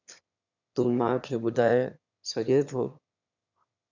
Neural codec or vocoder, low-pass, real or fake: autoencoder, 22.05 kHz, a latent of 192 numbers a frame, VITS, trained on one speaker; 7.2 kHz; fake